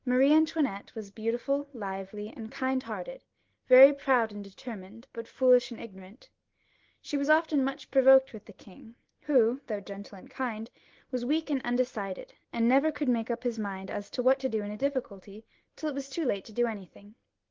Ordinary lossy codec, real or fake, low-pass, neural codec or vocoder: Opus, 16 kbps; real; 7.2 kHz; none